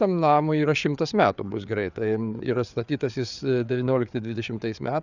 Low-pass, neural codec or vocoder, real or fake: 7.2 kHz; codec, 16 kHz, 4 kbps, FreqCodec, larger model; fake